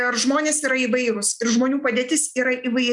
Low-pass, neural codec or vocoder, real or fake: 10.8 kHz; none; real